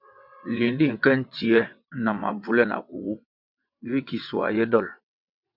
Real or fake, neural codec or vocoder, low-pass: fake; vocoder, 22.05 kHz, 80 mel bands, WaveNeXt; 5.4 kHz